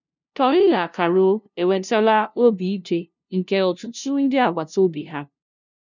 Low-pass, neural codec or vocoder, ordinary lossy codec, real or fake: 7.2 kHz; codec, 16 kHz, 0.5 kbps, FunCodec, trained on LibriTTS, 25 frames a second; none; fake